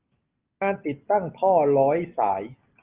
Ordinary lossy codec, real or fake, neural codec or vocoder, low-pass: Opus, 32 kbps; real; none; 3.6 kHz